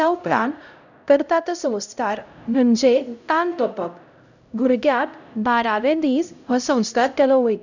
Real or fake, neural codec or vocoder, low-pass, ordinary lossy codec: fake; codec, 16 kHz, 0.5 kbps, X-Codec, HuBERT features, trained on LibriSpeech; 7.2 kHz; none